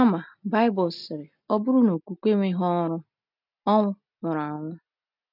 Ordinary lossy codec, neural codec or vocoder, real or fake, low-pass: none; none; real; 5.4 kHz